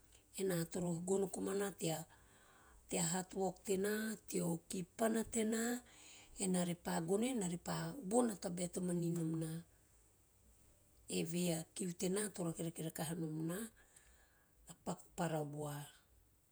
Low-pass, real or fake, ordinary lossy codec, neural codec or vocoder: none; fake; none; vocoder, 48 kHz, 128 mel bands, Vocos